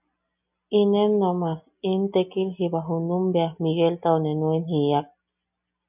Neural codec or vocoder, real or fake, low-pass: none; real; 3.6 kHz